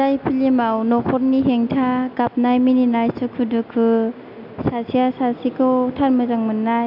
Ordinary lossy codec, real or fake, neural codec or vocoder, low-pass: none; real; none; 5.4 kHz